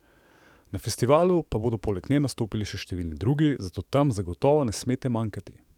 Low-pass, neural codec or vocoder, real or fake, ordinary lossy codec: 19.8 kHz; codec, 44.1 kHz, 7.8 kbps, DAC; fake; none